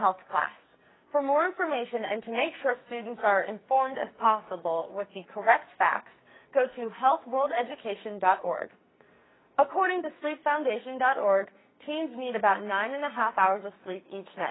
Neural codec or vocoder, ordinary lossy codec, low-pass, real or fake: codec, 44.1 kHz, 2.6 kbps, SNAC; AAC, 16 kbps; 7.2 kHz; fake